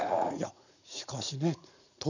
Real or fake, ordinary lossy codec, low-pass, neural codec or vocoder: fake; none; 7.2 kHz; vocoder, 44.1 kHz, 80 mel bands, Vocos